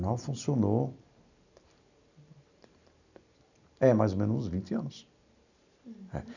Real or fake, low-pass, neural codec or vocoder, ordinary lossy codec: real; 7.2 kHz; none; none